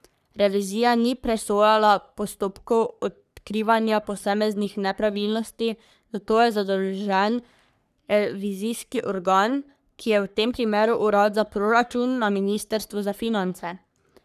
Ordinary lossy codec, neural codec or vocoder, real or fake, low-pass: none; codec, 44.1 kHz, 3.4 kbps, Pupu-Codec; fake; 14.4 kHz